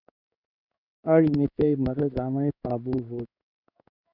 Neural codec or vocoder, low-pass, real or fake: codec, 16 kHz in and 24 kHz out, 1 kbps, XY-Tokenizer; 5.4 kHz; fake